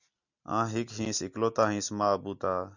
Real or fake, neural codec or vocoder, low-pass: real; none; 7.2 kHz